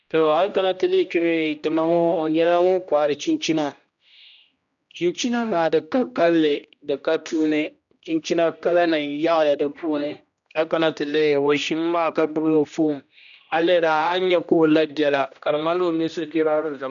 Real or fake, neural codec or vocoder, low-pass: fake; codec, 16 kHz, 1 kbps, X-Codec, HuBERT features, trained on general audio; 7.2 kHz